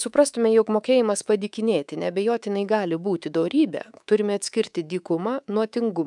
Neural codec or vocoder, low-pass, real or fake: codec, 24 kHz, 3.1 kbps, DualCodec; 10.8 kHz; fake